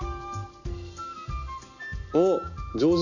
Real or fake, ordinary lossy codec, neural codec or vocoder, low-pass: real; none; none; 7.2 kHz